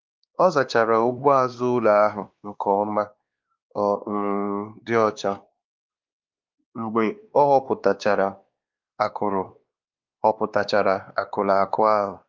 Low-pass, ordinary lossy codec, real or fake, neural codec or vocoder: 7.2 kHz; Opus, 32 kbps; fake; codec, 16 kHz, 2 kbps, X-Codec, WavLM features, trained on Multilingual LibriSpeech